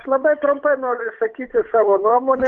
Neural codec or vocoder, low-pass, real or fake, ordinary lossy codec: codec, 16 kHz, 8 kbps, FunCodec, trained on Chinese and English, 25 frames a second; 7.2 kHz; fake; Opus, 32 kbps